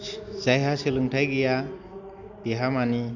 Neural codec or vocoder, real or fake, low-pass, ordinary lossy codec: none; real; 7.2 kHz; none